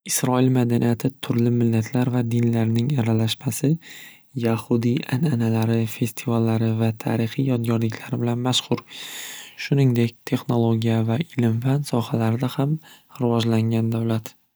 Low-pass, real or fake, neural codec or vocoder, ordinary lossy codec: none; real; none; none